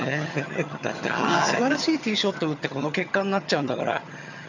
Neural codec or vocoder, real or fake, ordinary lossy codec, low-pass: vocoder, 22.05 kHz, 80 mel bands, HiFi-GAN; fake; none; 7.2 kHz